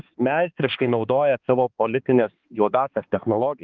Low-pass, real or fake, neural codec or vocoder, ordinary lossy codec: 7.2 kHz; fake; codec, 16 kHz, 2 kbps, X-Codec, HuBERT features, trained on balanced general audio; Opus, 32 kbps